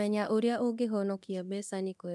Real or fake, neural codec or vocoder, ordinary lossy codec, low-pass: fake; codec, 24 kHz, 0.9 kbps, DualCodec; none; none